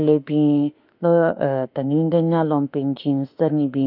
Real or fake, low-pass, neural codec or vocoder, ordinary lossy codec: fake; 5.4 kHz; autoencoder, 48 kHz, 32 numbers a frame, DAC-VAE, trained on Japanese speech; none